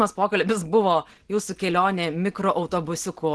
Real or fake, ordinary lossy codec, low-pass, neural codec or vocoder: real; Opus, 16 kbps; 10.8 kHz; none